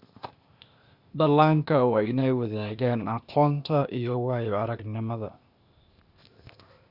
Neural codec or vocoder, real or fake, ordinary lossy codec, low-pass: codec, 16 kHz, 0.8 kbps, ZipCodec; fake; Opus, 64 kbps; 5.4 kHz